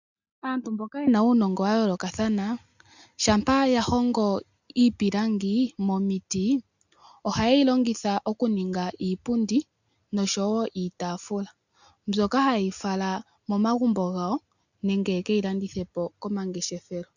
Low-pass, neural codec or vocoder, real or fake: 7.2 kHz; none; real